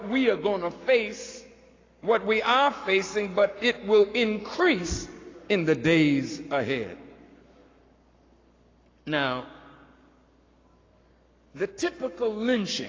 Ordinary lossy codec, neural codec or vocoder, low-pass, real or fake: AAC, 32 kbps; codec, 44.1 kHz, 7.8 kbps, Pupu-Codec; 7.2 kHz; fake